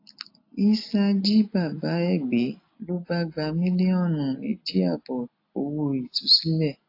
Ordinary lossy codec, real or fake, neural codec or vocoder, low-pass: AAC, 32 kbps; real; none; 5.4 kHz